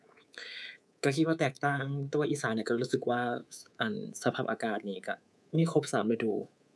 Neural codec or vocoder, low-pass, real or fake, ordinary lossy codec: codec, 24 kHz, 3.1 kbps, DualCodec; none; fake; none